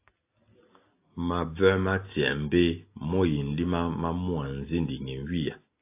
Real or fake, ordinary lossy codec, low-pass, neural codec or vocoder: real; AAC, 24 kbps; 3.6 kHz; none